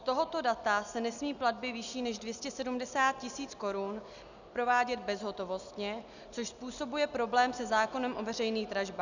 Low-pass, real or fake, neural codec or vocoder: 7.2 kHz; real; none